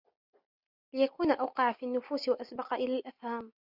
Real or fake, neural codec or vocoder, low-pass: real; none; 5.4 kHz